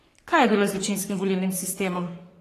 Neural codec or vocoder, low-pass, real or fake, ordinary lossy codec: codec, 44.1 kHz, 3.4 kbps, Pupu-Codec; 14.4 kHz; fake; AAC, 48 kbps